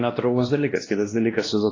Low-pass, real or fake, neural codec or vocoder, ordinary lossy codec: 7.2 kHz; fake; codec, 16 kHz, 1 kbps, X-Codec, WavLM features, trained on Multilingual LibriSpeech; AAC, 32 kbps